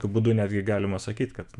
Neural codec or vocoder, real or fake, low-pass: autoencoder, 48 kHz, 128 numbers a frame, DAC-VAE, trained on Japanese speech; fake; 10.8 kHz